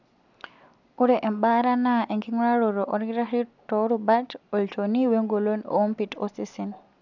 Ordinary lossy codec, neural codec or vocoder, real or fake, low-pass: none; none; real; 7.2 kHz